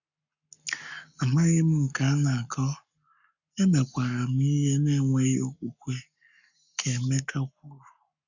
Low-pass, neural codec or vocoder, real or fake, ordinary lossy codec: 7.2 kHz; codec, 44.1 kHz, 7.8 kbps, Pupu-Codec; fake; none